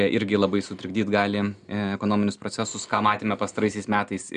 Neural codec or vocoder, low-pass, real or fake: none; 9.9 kHz; real